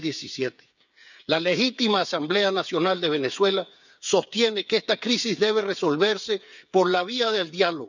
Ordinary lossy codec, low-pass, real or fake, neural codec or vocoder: none; 7.2 kHz; fake; codec, 16 kHz, 8 kbps, FreqCodec, smaller model